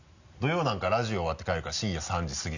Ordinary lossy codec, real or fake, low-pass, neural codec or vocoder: none; real; 7.2 kHz; none